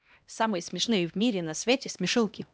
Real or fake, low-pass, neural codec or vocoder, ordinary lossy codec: fake; none; codec, 16 kHz, 1 kbps, X-Codec, HuBERT features, trained on LibriSpeech; none